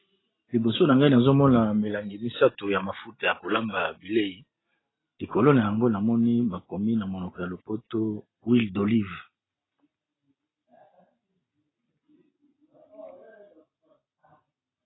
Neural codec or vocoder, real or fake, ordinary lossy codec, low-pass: none; real; AAC, 16 kbps; 7.2 kHz